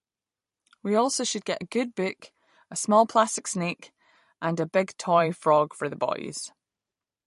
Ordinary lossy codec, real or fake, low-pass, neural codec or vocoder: MP3, 48 kbps; fake; 14.4 kHz; vocoder, 44.1 kHz, 128 mel bands every 512 samples, BigVGAN v2